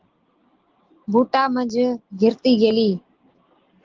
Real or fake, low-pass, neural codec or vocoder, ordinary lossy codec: real; 7.2 kHz; none; Opus, 16 kbps